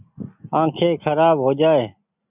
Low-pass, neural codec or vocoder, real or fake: 3.6 kHz; none; real